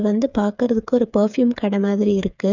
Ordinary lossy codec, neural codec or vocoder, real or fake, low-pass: none; codec, 16 kHz, 8 kbps, FreqCodec, smaller model; fake; 7.2 kHz